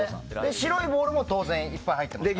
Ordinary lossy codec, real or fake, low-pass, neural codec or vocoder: none; real; none; none